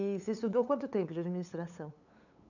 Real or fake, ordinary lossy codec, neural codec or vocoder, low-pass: fake; none; codec, 16 kHz, 8 kbps, FunCodec, trained on LibriTTS, 25 frames a second; 7.2 kHz